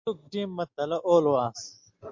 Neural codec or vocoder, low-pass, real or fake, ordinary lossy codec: none; 7.2 kHz; real; MP3, 48 kbps